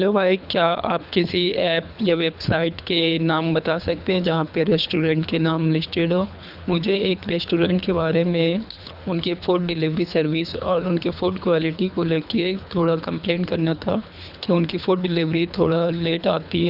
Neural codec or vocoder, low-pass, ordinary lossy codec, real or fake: codec, 24 kHz, 3 kbps, HILCodec; 5.4 kHz; none; fake